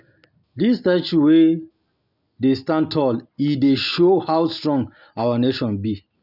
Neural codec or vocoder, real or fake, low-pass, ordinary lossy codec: none; real; 5.4 kHz; none